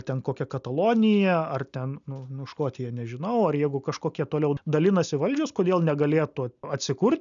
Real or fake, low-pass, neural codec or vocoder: real; 7.2 kHz; none